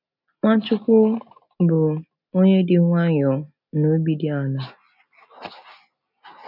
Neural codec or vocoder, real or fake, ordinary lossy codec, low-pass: none; real; none; 5.4 kHz